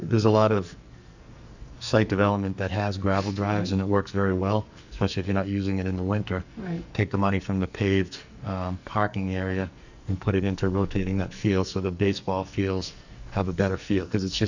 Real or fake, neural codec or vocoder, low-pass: fake; codec, 44.1 kHz, 2.6 kbps, SNAC; 7.2 kHz